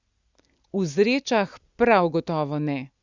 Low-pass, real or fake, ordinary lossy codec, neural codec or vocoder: 7.2 kHz; real; Opus, 64 kbps; none